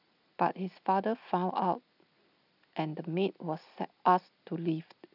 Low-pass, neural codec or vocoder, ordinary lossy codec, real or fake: 5.4 kHz; none; none; real